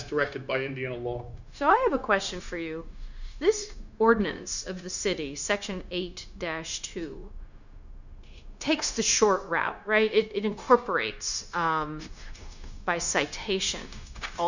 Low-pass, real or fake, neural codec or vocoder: 7.2 kHz; fake; codec, 16 kHz, 0.9 kbps, LongCat-Audio-Codec